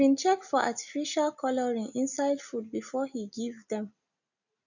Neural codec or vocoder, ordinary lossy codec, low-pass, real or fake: none; none; 7.2 kHz; real